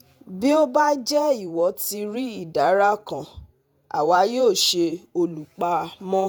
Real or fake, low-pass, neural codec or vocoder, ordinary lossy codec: fake; none; vocoder, 48 kHz, 128 mel bands, Vocos; none